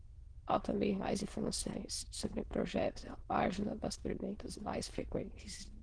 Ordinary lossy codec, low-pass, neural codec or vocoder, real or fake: Opus, 16 kbps; 9.9 kHz; autoencoder, 22.05 kHz, a latent of 192 numbers a frame, VITS, trained on many speakers; fake